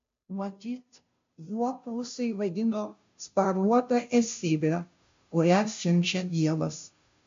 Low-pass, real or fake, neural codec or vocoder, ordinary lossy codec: 7.2 kHz; fake; codec, 16 kHz, 0.5 kbps, FunCodec, trained on Chinese and English, 25 frames a second; MP3, 48 kbps